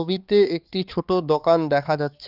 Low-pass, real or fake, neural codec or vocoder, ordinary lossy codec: 5.4 kHz; fake; codec, 16 kHz, 4 kbps, X-Codec, HuBERT features, trained on balanced general audio; Opus, 24 kbps